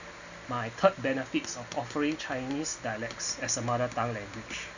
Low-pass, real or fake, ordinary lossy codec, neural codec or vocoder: 7.2 kHz; real; none; none